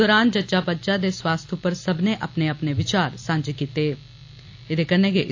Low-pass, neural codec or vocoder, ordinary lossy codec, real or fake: 7.2 kHz; none; AAC, 48 kbps; real